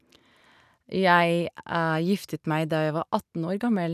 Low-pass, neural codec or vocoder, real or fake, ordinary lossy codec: 14.4 kHz; none; real; none